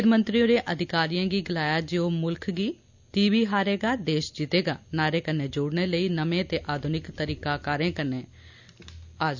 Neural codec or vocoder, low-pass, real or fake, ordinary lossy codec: none; 7.2 kHz; real; none